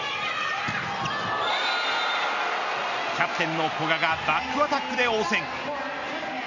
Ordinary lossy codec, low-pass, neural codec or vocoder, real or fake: none; 7.2 kHz; none; real